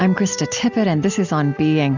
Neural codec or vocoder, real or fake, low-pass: none; real; 7.2 kHz